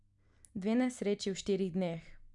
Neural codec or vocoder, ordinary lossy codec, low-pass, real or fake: none; MP3, 96 kbps; 10.8 kHz; real